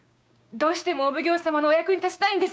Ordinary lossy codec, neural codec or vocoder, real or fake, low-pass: none; codec, 16 kHz, 6 kbps, DAC; fake; none